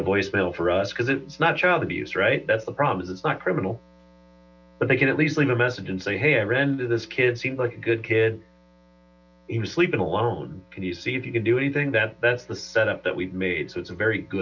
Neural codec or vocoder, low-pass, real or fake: none; 7.2 kHz; real